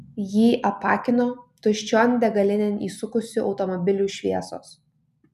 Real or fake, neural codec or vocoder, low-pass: real; none; 14.4 kHz